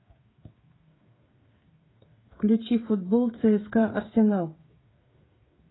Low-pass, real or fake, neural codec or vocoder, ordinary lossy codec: 7.2 kHz; fake; codec, 16 kHz, 8 kbps, FreqCodec, smaller model; AAC, 16 kbps